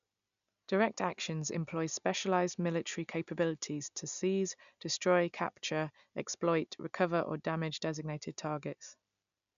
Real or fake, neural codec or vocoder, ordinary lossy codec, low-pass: real; none; none; 7.2 kHz